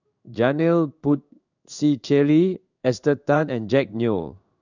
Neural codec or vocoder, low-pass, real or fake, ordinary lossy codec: codec, 16 kHz in and 24 kHz out, 1 kbps, XY-Tokenizer; 7.2 kHz; fake; none